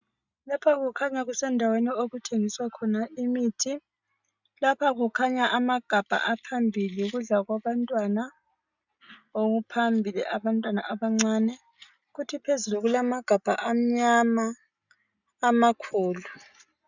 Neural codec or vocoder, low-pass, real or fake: none; 7.2 kHz; real